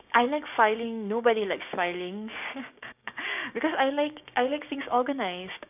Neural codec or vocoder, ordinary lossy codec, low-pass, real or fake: codec, 16 kHz, 6 kbps, DAC; none; 3.6 kHz; fake